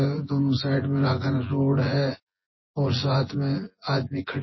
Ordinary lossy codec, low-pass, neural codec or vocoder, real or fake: MP3, 24 kbps; 7.2 kHz; vocoder, 24 kHz, 100 mel bands, Vocos; fake